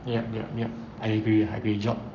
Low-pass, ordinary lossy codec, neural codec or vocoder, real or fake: 7.2 kHz; none; codec, 44.1 kHz, 7.8 kbps, Pupu-Codec; fake